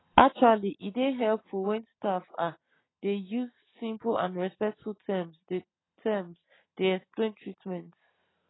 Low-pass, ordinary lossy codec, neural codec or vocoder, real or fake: 7.2 kHz; AAC, 16 kbps; none; real